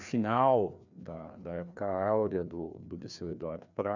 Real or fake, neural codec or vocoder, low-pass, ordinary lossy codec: fake; codec, 16 kHz, 2 kbps, FreqCodec, larger model; 7.2 kHz; none